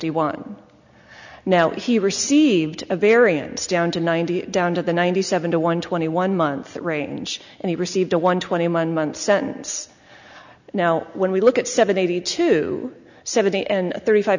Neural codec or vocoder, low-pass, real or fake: none; 7.2 kHz; real